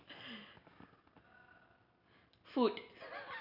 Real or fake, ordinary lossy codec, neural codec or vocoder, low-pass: real; none; none; 5.4 kHz